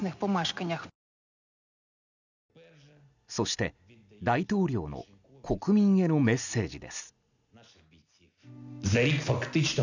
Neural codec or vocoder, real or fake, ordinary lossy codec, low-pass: none; real; none; 7.2 kHz